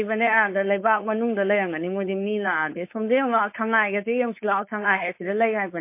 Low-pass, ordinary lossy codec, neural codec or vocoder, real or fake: 3.6 kHz; MP3, 24 kbps; codec, 16 kHz, 4.8 kbps, FACodec; fake